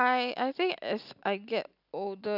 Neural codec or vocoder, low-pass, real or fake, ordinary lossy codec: none; 5.4 kHz; real; none